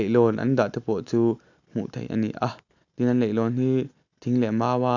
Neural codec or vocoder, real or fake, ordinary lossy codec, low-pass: none; real; none; 7.2 kHz